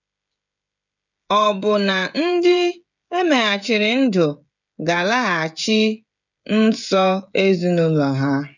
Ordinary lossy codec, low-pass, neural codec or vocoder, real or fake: none; 7.2 kHz; codec, 16 kHz, 16 kbps, FreqCodec, smaller model; fake